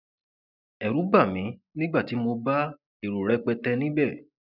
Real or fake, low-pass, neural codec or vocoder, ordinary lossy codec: real; 5.4 kHz; none; none